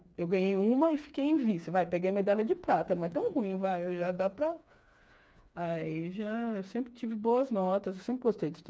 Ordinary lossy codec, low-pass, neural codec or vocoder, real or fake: none; none; codec, 16 kHz, 4 kbps, FreqCodec, smaller model; fake